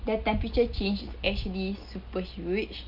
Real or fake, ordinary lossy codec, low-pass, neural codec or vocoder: real; Opus, 24 kbps; 5.4 kHz; none